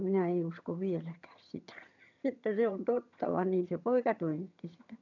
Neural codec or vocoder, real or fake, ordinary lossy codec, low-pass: vocoder, 22.05 kHz, 80 mel bands, HiFi-GAN; fake; none; 7.2 kHz